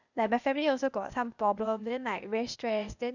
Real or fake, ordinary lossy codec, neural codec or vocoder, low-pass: fake; none; codec, 16 kHz, 0.8 kbps, ZipCodec; 7.2 kHz